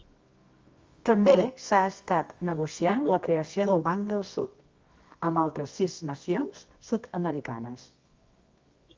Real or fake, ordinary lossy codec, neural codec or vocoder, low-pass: fake; Opus, 32 kbps; codec, 24 kHz, 0.9 kbps, WavTokenizer, medium music audio release; 7.2 kHz